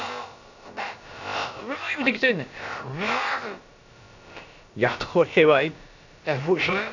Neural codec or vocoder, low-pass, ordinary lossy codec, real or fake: codec, 16 kHz, about 1 kbps, DyCAST, with the encoder's durations; 7.2 kHz; none; fake